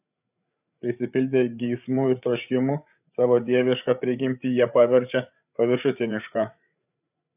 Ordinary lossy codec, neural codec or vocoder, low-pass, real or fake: AAC, 32 kbps; codec, 16 kHz, 8 kbps, FreqCodec, larger model; 3.6 kHz; fake